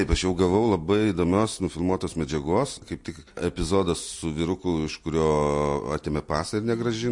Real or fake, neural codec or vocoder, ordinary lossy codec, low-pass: real; none; MP3, 48 kbps; 10.8 kHz